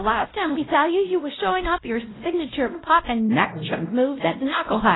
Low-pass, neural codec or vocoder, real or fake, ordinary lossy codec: 7.2 kHz; codec, 16 kHz, 0.5 kbps, X-Codec, WavLM features, trained on Multilingual LibriSpeech; fake; AAC, 16 kbps